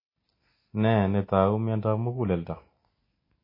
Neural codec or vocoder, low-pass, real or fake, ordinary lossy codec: none; 5.4 kHz; real; MP3, 24 kbps